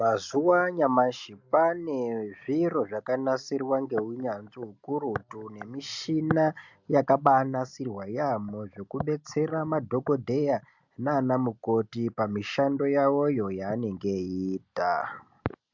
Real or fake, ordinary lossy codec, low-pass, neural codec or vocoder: real; MP3, 64 kbps; 7.2 kHz; none